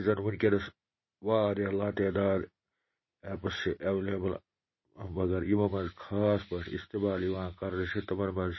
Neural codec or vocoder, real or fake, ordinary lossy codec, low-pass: none; real; MP3, 24 kbps; 7.2 kHz